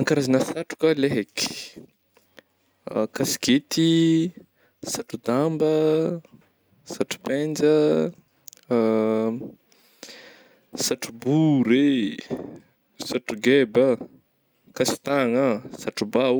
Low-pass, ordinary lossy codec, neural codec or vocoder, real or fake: none; none; none; real